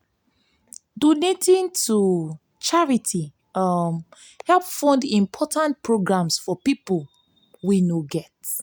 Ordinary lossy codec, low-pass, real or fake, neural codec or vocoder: none; none; real; none